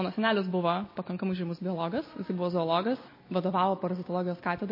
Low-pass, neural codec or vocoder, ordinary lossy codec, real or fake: 5.4 kHz; none; MP3, 24 kbps; real